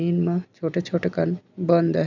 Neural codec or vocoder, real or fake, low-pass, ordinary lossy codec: none; real; 7.2 kHz; none